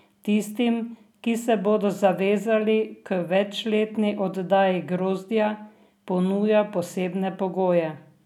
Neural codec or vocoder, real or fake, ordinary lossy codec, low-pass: none; real; none; 19.8 kHz